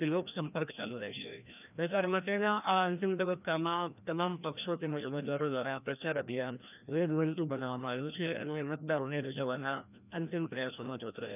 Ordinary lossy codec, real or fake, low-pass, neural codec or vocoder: none; fake; 3.6 kHz; codec, 16 kHz, 1 kbps, FreqCodec, larger model